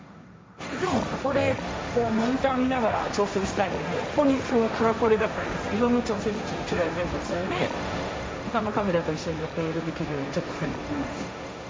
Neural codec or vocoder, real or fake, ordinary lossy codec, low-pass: codec, 16 kHz, 1.1 kbps, Voila-Tokenizer; fake; none; none